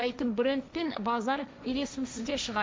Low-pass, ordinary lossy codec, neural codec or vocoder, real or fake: none; none; codec, 16 kHz, 1.1 kbps, Voila-Tokenizer; fake